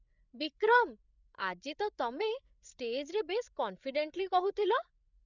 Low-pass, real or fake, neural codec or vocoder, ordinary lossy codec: 7.2 kHz; fake; codec, 16 kHz, 8 kbps, FreqCodec, larger model; none